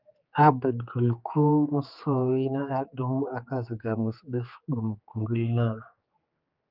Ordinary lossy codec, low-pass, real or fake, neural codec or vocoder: Opus, 24 kbps; 5.4 kHz; fake; codec, 16 kHz, 4 kbps, X-Codec, HuBERT features, trained on general audio